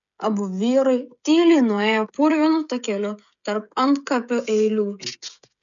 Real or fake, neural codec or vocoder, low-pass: fake; codec, 16 kHz, 16 kbps, FreqCodec, smaller model; 7.2 kHz